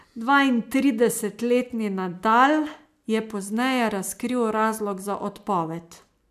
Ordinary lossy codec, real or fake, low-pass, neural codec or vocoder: AAC, 96 kbps; real; 14.4 kHz; none